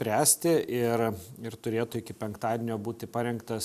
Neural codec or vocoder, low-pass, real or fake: none; 14.4 kHz; real